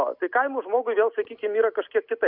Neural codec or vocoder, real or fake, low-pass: none; real; 5.4 kHz